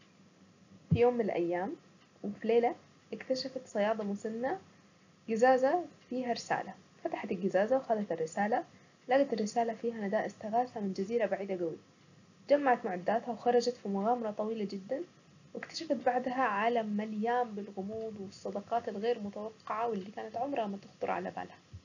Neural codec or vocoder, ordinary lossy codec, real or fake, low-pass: none; none; real; 7.2 kHz